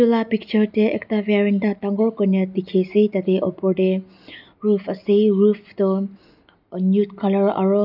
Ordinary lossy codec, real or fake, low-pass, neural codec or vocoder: none; real; 5.4 kHz; none